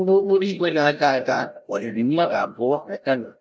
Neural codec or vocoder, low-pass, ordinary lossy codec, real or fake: codec, 16 kHz, 0.5 kbps, FreqCodec, larger model; none; none; fake